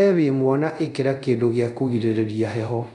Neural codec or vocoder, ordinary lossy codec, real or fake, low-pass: codec, 24 kHz, 0.5 kbps, DualCodec; none; fake; 10.8 kHz